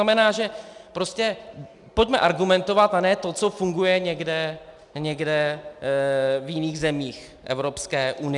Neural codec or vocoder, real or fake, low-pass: none; real; 10.8 kHz